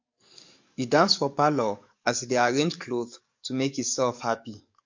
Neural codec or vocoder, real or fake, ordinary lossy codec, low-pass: codec, 44.1 kHz, 7.8 kbps, DAC; fake; MP3, 48 kbps; 7.2 kHz